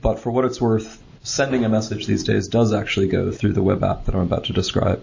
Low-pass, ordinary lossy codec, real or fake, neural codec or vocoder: 7.2 kHz; MP3, 32 kbps; real; none